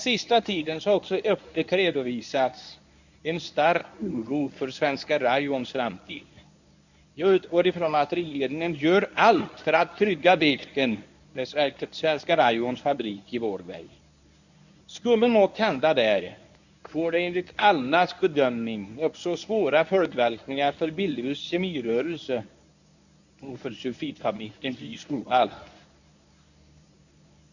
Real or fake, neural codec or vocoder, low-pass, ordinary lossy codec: fake; codec, 24 kHz, 0.9 kbps, WavTokenizer, medium speech release version 1; 7.2 kHz; none